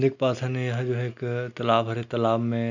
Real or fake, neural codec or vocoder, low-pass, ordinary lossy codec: real; none; 7.2 kHz; none